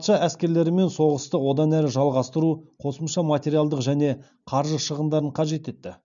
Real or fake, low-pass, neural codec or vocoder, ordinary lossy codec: real; 7.2 kHz; none; none